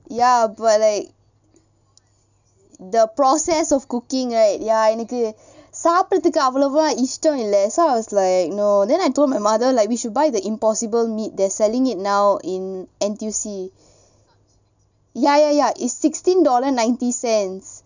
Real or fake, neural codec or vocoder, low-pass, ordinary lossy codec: real; none; 7.2 kHz; none